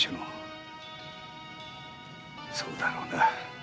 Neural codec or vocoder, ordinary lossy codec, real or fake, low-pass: none; none; real; none